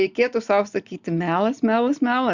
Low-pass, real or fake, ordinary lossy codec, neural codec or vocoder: 7.2 kHz; real; Opus, 64 kbps; none